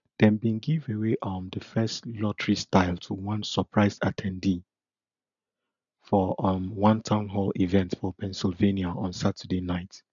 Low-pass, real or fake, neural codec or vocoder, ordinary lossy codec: 7.2 kHz; real; none; none